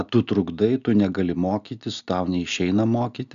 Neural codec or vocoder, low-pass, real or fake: none; 7.2 kHz; real